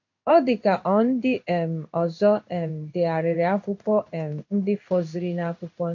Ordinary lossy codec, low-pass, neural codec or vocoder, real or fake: MP3, 48 kbps; 7.2 kHz; codec, 16 kHz in and 24 kHz out, 1 kbps, XY-Tokenizer; fake